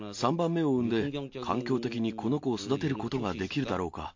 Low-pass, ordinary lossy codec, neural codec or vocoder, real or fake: 7.2 kHz; MP3, 48 kbps; none; real